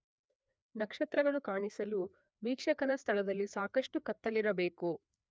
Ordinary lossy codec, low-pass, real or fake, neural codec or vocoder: none; none; fake; codec, 16 kHz, 2 kbps, FreqCodec, larger model